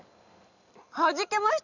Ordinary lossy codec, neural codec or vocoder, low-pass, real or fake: none; none; 7.2 kHz; real